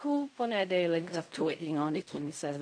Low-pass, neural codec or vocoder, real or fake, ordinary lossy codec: 9.9 kHz; codec, 16 kHz in and 24 kHz out, 0.4 kbps, LongCat-Audio-Codec, fine tuned four codebook decoder; fake; MP3, 64 kbps